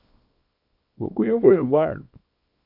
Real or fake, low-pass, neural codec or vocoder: fake; 5.4 kHz; codec, 24 kHz, 0.9 kbps, WavTokenizer, small release